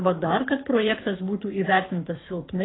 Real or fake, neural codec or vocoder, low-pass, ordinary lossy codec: fake; codec, 24 kHz, 6 kbps, HILCodec; 7.2 kHz; AAC, 16 kbps